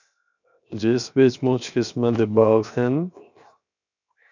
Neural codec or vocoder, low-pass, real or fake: codec, 16 kHz, 0.7 kbps, FocalCodec; 7.2 kHz; fake